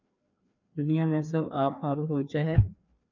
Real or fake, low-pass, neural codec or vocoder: fake; 7.2 kHz; codec, 16 kHz, 2 kbps, FreqCodec, larger model